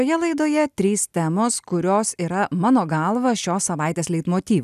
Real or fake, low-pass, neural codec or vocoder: real; 14.4 kHz; none